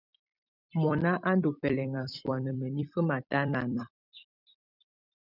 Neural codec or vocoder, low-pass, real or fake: vocoder, 44.1 kHz, 128 mel bands every 256 samples, BigVGAN v2; 5.4 kHz; fake